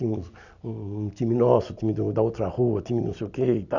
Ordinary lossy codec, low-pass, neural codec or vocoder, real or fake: none; 7.2 kHz; none; real